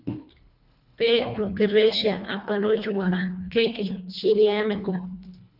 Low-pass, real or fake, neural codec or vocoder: 5.4 kHz; fake; codec, 24 kHz, 1.5 kbps, HILCodec